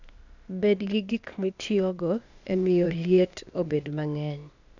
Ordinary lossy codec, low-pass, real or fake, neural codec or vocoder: none; 7.2 kHz; fake; codec, 16 kHz, 0.8 kbps, ZipCodec